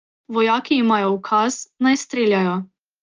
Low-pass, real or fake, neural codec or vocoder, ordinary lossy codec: 7.2 kHz; real; none; Opus, 24 kbps